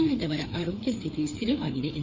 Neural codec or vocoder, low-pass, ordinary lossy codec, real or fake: codec, 16 kHz, 4 kbps, FreqCodec, larger model; 7.2 kHz; MP3, 48 kbps; fake